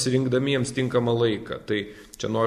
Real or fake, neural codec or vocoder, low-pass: real; none; 14.4 kHz